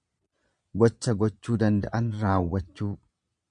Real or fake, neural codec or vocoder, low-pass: fake; vocoder, 22.05 kHz, 80 mel bands, Vocos; 9.9 kHz